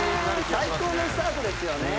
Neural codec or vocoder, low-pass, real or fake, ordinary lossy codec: none; none; real; none